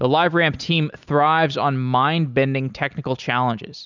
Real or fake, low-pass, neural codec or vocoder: real; 7.2 kHz; none